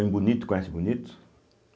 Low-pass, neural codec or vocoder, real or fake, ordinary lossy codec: none; none; real; none